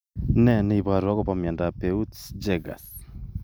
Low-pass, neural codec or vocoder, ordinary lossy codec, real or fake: none; none; none; real